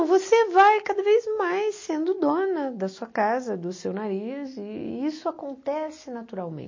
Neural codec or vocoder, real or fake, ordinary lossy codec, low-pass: none; real; MP3, 32 kbps; 7.2 kHz